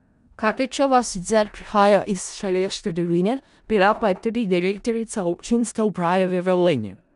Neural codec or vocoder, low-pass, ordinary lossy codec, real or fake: codec, 16 kHz in and 24 kHz out, 0.4 kbps, LongCat-Audio-Codec, four codebook decoder; 10.8 kHz; none; fake